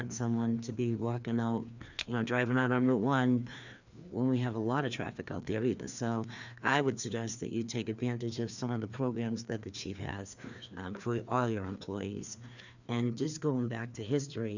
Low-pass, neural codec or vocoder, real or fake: 7.2 kHz; codec, 16 kHz, 2 kbps, FreqCodec, larger model; fake